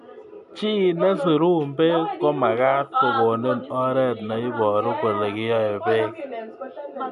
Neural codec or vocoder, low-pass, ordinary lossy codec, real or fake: none; 10.8 kHz; none; real